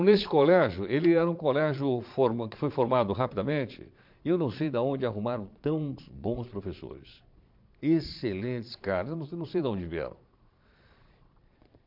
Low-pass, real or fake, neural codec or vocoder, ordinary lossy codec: 5.4 kHz; fake; vocoder, 22.05 kHz, 80 mel bands, Vocos; MP3, 48 kbps